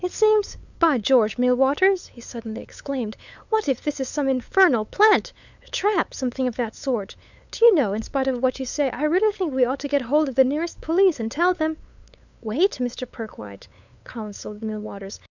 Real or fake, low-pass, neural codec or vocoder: fake; 7.2 kHz; codec, 16 kHz, 8 kbps, FunCodec, trained on LibriTTS, 25 frames a second